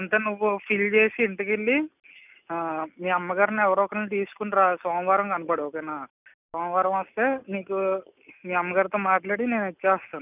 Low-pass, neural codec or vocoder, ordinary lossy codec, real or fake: 3.6 kHz; none; none; real